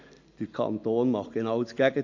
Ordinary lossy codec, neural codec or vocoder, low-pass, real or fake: AAC, 48 kbps; none; 7.2 kHz; real